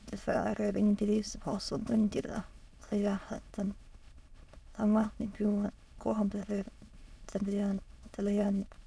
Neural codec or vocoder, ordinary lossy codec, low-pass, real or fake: autoencoder, 22.05 kHz, a latent of 192 numbers a frame, VITS, trained on many speakers; none; none; fake